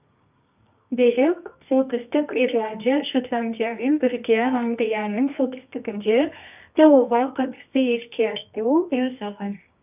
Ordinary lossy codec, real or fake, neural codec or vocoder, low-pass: none; fake; codec, 24 kHz, 0.9 kbps, WavTokenizer, medium music audio release; 3.6 kHz